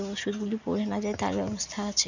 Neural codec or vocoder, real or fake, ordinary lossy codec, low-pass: none; real; none; 7.2 kHz